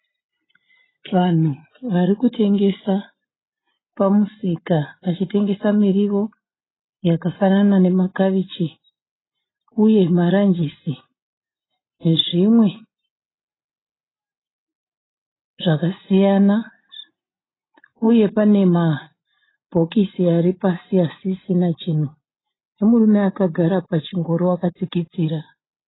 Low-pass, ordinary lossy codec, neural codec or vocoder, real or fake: 7.2 kHz; AAC, 16 kbps; none; real